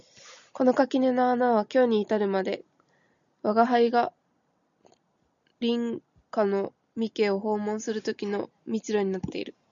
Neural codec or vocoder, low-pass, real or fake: none; 7.2 kHz; real